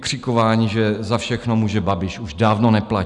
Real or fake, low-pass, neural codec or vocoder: real; 10.8 kHz; none